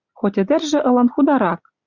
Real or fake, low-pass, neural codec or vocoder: real; 7.2 kHz; none